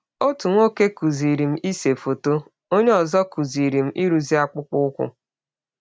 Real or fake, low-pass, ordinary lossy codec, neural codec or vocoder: real; none; none; none